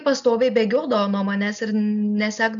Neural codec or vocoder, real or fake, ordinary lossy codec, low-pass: none; real; MP3, 96 kbps; 7.2 kHz